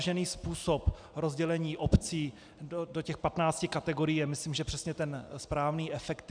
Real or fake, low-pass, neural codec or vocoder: real; 9.9 kHz; none